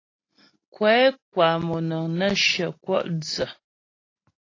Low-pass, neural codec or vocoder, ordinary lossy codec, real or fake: 7.2 kHz; none; AAC, 32 kbps; real